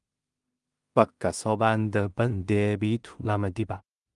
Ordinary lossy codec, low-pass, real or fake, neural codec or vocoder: Opus, 32 kbps; 10.8 kHz; fake; codec, 16 kHz in and 24 kHz out, 0.4 kbps, LongCat-Audio-Codec, two codebook decoder